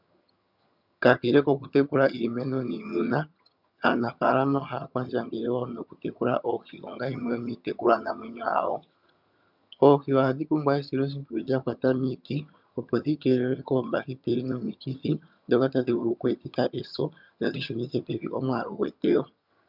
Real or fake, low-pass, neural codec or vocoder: fake; 5.4 kHz; vocoder, 22.05 kHz, 80 mel bands, HiFi-GAN